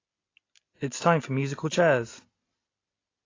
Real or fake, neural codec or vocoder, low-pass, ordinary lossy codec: real; none; 7.2 kHz; AAC, 32 kbps